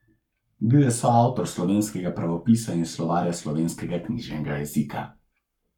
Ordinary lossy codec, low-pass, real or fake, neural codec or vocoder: none; 19.8 kHz; fake; codec, 44.1 kHz, 7.8 kbps, Pupu-Codec